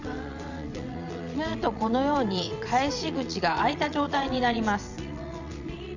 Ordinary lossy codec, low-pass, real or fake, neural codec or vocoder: none; 7.2 kHz; fake; vocoder, 22.05 kHz, 80 mel bands, WaveNeXt